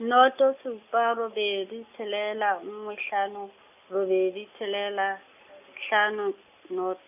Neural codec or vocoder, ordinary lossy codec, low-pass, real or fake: none; none; 3.6 kHz; real